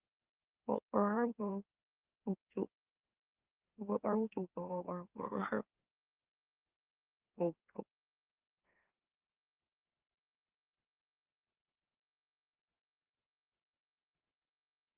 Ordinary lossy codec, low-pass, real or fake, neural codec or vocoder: Opus, 32 kbps; 3.6 kHz; fake; autoencoder, 44.1 kHz, a latent of 192 numbers a frame, MeloTTS